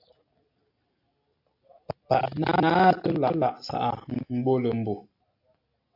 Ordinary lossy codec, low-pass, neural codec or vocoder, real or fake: AAC, 48 kbps; 5.4 kHz; none; real